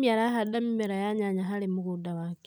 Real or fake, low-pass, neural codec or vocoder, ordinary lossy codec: real; none; none; none